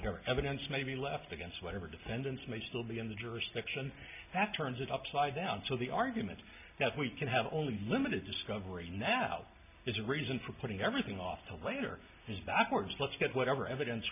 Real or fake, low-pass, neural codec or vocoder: real; 3.6 kHz; none